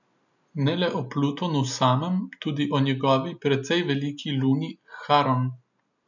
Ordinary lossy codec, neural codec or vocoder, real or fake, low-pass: none; none; real; 7.2 kHz